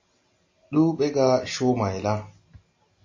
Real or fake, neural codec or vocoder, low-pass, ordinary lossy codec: real; none; 7.2 kHz; MP3, 32 kbps